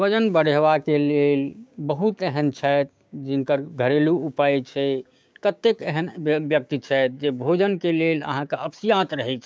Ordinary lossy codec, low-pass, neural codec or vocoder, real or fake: none; none; codec, 16 kHz, 6 kbps, DAC; fake